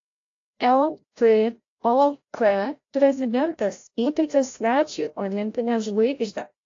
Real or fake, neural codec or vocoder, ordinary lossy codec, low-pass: fake; codec, 16 kHz, 0.5 kbps, FreqCodec, larger model; AAC, 48 kbps; 7.2 kHz